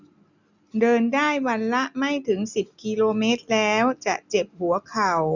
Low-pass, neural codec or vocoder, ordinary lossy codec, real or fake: 7.2 kHz; none; none; real